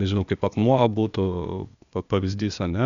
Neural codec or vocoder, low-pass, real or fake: codec, 16 kHz, 0.8 kbps, ZipCodec; 7.2 kHz; fake